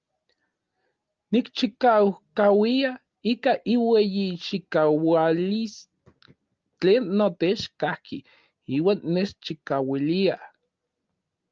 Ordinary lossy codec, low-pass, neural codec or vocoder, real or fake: Opus, 24 kbps; 7.2 kHz; none; real